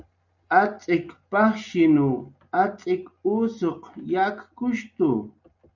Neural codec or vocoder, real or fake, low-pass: none; real; 7.2 kHz